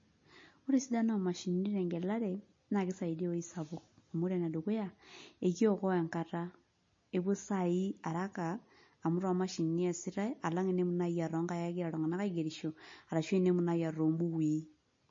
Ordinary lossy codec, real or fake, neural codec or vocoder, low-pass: MP3, 32 kbps; real; none; 7.2 kHz